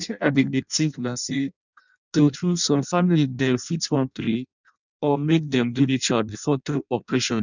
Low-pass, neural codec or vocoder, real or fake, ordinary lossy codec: 7.2 kHz; codec, 16 kHz in and 24 kHz out, 0.6 kbps, FireRedTTS-2 codec; fake; none